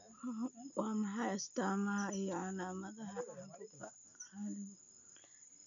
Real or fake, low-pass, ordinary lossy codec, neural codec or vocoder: real; 7.2 kHz; none; none